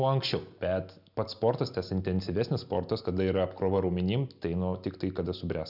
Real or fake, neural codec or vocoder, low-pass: real; none; 5.4 kHz